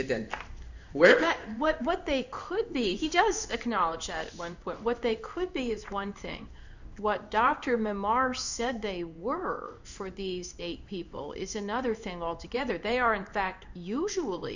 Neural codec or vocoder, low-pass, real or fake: codec, 16 kHz in and 24 kHz out, 1 kbps, XY-Tokenizer; 7.2 kHz; fake